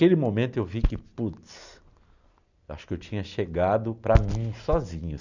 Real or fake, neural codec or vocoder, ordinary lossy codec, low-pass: real; none; none; 7.2 kHz